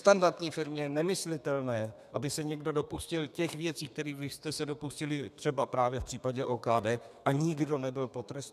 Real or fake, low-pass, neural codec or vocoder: fake; 14.4 kHz; codec, 32 kHz, 1.9 kbps, SNAC